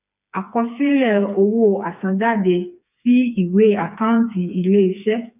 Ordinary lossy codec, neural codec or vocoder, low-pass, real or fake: none; codec, 16 kHz, 4 kbps, FreqCodec, smaller model; 3.6 kHz; fake